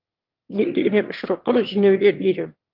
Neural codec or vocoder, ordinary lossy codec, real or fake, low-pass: autoencoder, 22.05 kHz, a latent of 192 numbers a frame, VITS, trained on one speaker; Opus, 24 kbps; fake; 5.4 kHz